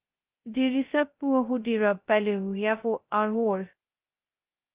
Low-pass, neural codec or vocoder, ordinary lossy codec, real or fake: 3.6 kHz; codec, 16 kHz, 0.2 kbps, FocalCodec; Opus, 32 kbps; fake